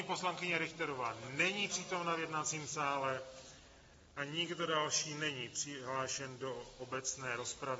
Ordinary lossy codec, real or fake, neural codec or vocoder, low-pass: AAC, 24 kbps; real; none; 19.8 kHz